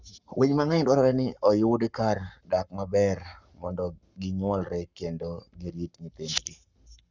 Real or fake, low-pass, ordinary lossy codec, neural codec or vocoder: fake; 7.2 kHz; Opus, 64 kbps; codec, 44.1 kHz, 7.8 kbps, Pupu-Codec